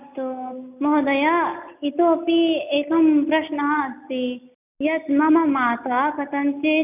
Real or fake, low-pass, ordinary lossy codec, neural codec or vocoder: real; 3.6 kHz; none; none